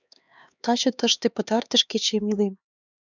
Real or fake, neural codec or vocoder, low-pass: fake; codec, 16 kHz, 4 kbps, X-Codec, HuBERT features, trained on LibriSpeech; 7.2 kHz